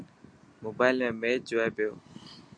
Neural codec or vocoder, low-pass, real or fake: none; 9.9 kHz; real